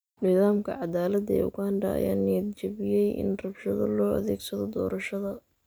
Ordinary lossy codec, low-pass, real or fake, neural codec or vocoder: none; none; real; none